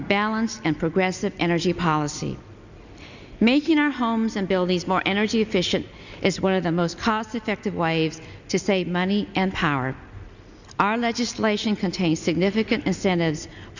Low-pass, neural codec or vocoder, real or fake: 7.2 kHz; none; real